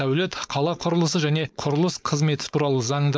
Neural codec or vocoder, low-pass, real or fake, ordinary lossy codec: codec, 16 kHz, 4.8 kbps, FACodec; none; fake; none